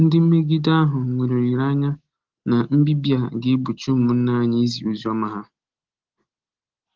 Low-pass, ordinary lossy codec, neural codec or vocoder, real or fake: 7.2 kHz; Opus, 16 kbps; none; real